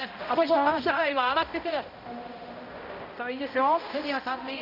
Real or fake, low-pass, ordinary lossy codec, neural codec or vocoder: fake; 5.4 kHz; none; codec, 16 kHz, 0.5 kbps, X-Codec, HuBERT features, trained on balanced general audio